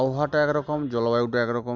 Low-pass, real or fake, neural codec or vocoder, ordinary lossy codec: 7.2 kHz; real; none; MP3, 64 kbps